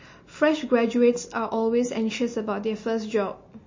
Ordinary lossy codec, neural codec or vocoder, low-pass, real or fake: MP3, 32 kbps; none; 7.2 kHz; real